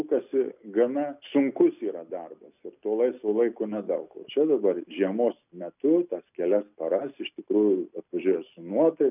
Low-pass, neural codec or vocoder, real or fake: 3.6 kHz; none; real